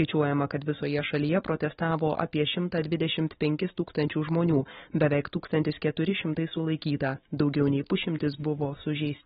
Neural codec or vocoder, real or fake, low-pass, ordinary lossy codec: none; real; 7.2 kHz; AAC, 16 kbps